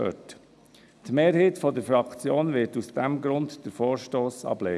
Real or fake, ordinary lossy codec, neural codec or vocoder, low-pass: real; none; none; none